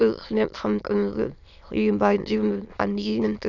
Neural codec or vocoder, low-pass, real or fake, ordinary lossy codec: autoencoder, 22.05 kHz, a latent of 192 numbers a frame, VITS, trained on many speakers; 7.2 kHz; fake; none